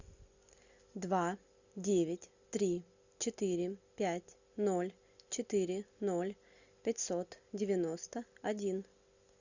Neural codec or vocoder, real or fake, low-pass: none; real; 7.2 kHz